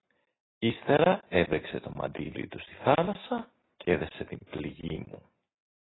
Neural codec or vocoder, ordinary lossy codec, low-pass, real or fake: none; AAC, 16 kbps; 7.2 kHz; real